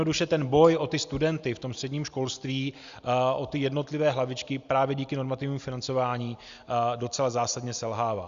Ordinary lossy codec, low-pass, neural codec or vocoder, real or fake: Opus, 64 kbps; 7.2 kHz; none; real